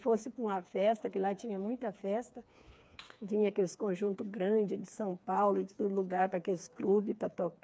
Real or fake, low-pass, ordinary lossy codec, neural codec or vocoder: fake; none; none; codec, 16 kHz, 4 kbps, FreqCodec, smaller model